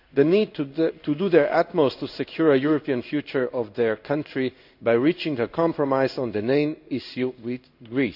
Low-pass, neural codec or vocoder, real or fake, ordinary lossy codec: 5.4 kHz; codec, 16 kHz in and 24 kHz out, 1 kbps, XY-Tokenizer; fake; none